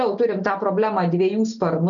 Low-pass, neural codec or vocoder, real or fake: 7.2 kHz; none; real